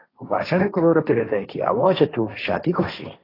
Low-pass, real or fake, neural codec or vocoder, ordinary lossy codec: 5.4 kHz; fake; codec, 16 kHz, 1.1 kbps, Voila-Tokenizer; AAC, 24 kbps